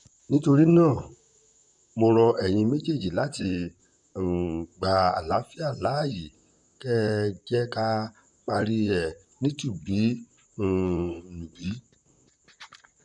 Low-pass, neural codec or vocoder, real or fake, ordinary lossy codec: 10.8 kHz; vocoder, 44.1 kHz, 128 mel bands, Pupu-Vocoder; fake; none